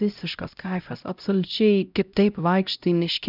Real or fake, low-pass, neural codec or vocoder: fake; 5.4 kHz; codec, 16 kHz, 0.5 kbps, X-Codec, HuBERT features, trained on LibriSpeech